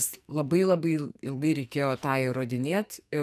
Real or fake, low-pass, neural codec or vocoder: fake; 14.4 kHz; codec, 44.1 kHz, 2.6 kbps, SNAC